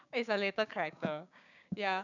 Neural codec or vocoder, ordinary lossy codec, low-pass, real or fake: codec, 16 kHz, 6 kbps, DAC; none; 7.2 kHz; fake